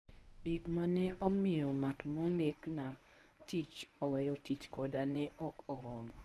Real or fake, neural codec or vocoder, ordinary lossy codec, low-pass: fake; codec, 24 kHz, 0.9 kbps, WavTokenizer, medium speech release version 1; none; none